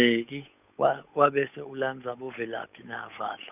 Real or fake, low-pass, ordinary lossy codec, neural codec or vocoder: fake; 3.6 kHz; none; codec, 16 kHz, 8 kbps, FunCodec, trained on Chinese and English, 25 frames a second